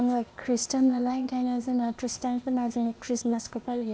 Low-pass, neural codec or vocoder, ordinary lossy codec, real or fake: none; codec, 16 kHz, 0.8 kbps, ZipCodec; none; fake